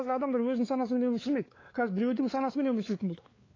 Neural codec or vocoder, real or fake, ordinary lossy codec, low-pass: codec, 16 kHz, 4 kbps, X-Codec, WavLM features, trained on Multilingual LibriSpeech; fake; AAC, 32 kbps; 7.2 kHz